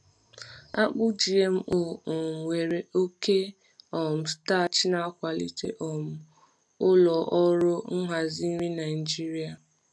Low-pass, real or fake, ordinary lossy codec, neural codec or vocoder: none; real; none; none